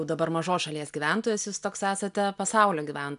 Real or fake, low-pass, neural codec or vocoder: real; 10.8 kHz; none